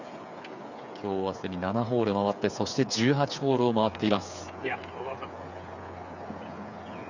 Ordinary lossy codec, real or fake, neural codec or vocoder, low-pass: none; fake; codec, 16 kHz, 8 kbps, FreqCodec, smaller model; 7.2 kHz